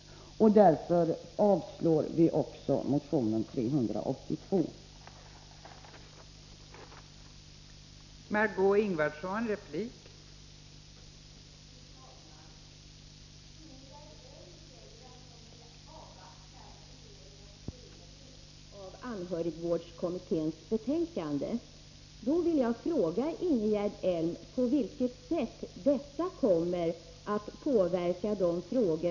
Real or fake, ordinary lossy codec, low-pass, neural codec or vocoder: real; none; 7.2 kHz; none